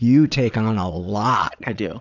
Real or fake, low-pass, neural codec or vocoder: fake; 7.2 kHz; vocoder, 22.05 kHz, 80 mel bands, Vocos